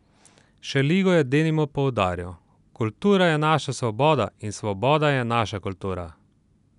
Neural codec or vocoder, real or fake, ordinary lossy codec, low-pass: none; real; none; 10.8 kHz